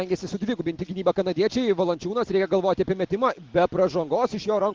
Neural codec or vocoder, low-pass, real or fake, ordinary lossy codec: none; 7.2 kHz; real; Opus, 16 kbps